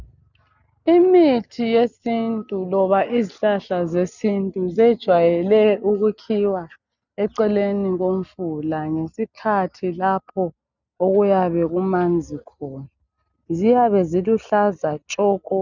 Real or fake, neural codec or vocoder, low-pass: real; none; 7.2 kHz